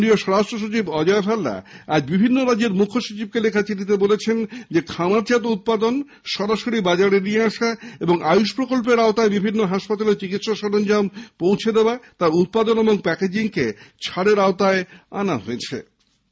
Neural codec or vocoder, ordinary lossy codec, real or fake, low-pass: none; none; real; none